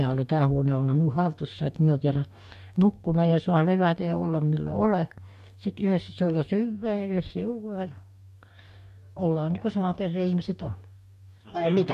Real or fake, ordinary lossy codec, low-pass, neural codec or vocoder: fake; AAC, 96 kbps; 14.4 kHz; codec, 44.1 kHz, 2.6 kbps, DAC